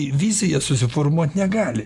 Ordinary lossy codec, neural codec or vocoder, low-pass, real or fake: MP3, 64 kbps; none; 10.8 kHz; real